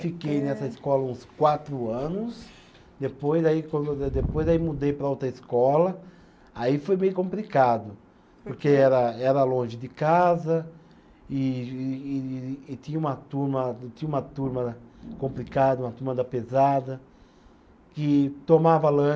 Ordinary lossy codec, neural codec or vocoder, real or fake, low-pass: none; none; real; none